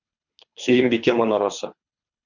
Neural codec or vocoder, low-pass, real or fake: codec, 24 kHz, 3 kbps, HILCodec; 7.2 kHz; fake